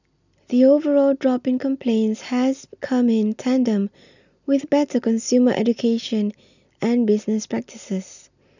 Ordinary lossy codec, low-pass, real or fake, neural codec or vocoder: none; 7.2 kHz; real; none